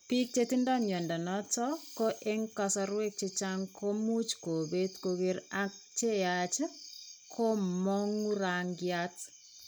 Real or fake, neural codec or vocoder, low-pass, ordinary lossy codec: real; none; none; none